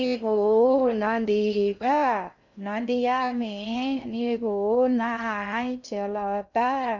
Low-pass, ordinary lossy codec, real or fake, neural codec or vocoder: 7.2 kHz; none; fake; codec, 16 kHz in and 24 kHz out, 0.6 kbps, FocalCodec, streaming, 4096 codes